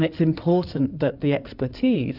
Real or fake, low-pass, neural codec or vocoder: fake; 5.4 kHz; codec, 16 kHz in and 24 kHz out, 2.2 kbps, FireRedTTS-2 codec